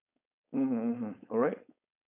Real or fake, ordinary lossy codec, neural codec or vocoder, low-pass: fake; none; codec, 16 kHz, 4.8 kbps, FACodec; 3.6 kHz